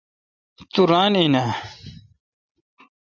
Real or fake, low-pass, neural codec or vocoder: real; 7.2 kHz; none